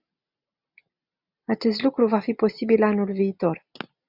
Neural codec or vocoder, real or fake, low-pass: none; real; 5.4 kHz